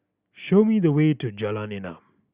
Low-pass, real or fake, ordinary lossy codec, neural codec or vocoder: 3.6 kHz; real; Opus, 64 kbps; none